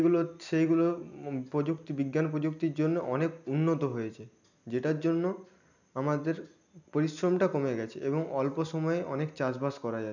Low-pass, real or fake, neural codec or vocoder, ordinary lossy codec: 7.2 kHz; real; none; none